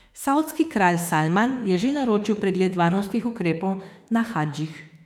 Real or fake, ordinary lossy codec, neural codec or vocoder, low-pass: fake; none; autoencoder, 48 kHz, 32 numbers a frame, DAC-VAE, trained on Japanese speech; 19.8 kHz